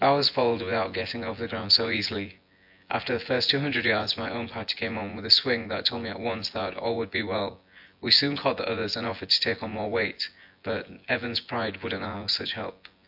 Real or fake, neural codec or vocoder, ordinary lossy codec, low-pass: fake; vocoder, 24 kHz, 100 mel bands, Vocos; AAC, 48 kbps; 5.4 kHz